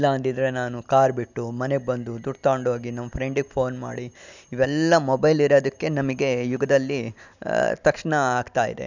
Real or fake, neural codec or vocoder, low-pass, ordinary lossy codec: real; none; 7.2 kHz; none